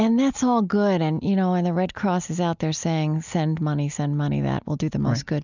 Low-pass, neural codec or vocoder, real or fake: 7.2 kHz; none; real